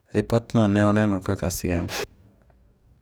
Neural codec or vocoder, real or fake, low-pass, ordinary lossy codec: codec, 44.1 kHz, 2.6 kbps, DAC; fake; none; none